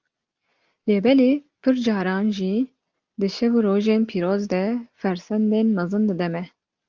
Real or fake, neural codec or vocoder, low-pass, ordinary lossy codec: real; none; 7.2 kHz; Opus, 24 kbps